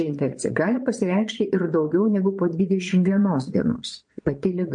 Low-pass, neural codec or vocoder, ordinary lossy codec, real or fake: 9.9 kHz; vocoder, 22.05 kHz, 80 mel bands, Vocos; MP3, 48 kbps; fake